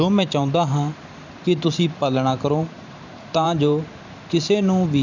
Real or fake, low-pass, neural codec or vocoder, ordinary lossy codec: fake; 7.2 kHz; vocoder, 44.1 kHz, 128 mel bands every 512 samples, BigVGAN v2; none